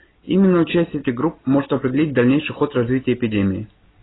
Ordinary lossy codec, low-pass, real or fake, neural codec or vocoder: AAC, 16 kbps; 7.2 kHz; real; none